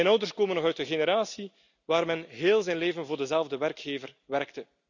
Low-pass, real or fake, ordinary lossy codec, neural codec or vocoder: 7.2 kHz; real; none; none